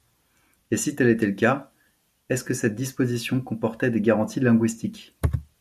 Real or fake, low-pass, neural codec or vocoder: real; 14.4 kHz; none